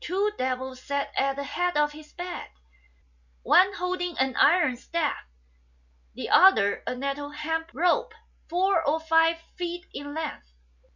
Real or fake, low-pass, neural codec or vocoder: real; 7.2 kHz; none